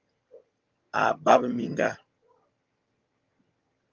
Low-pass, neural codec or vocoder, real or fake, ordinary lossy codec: 7.2 kHz; vocoder, 22.05 kHz, 80 mel bands, HiFi-GAN; fake; Opus, 32 kbps